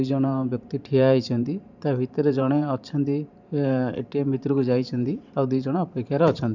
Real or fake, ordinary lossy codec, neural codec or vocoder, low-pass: fake; none; vocoder, 44.1 kHz, 128 mel bands every 512 samples, BigVGAN v2; 7.2 kHz